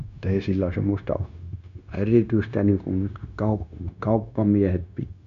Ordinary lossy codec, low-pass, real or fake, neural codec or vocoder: none; 7.2 kHz; fake; codec, 16 kHz, 0.9 kbps, LongCat-Audio-Codec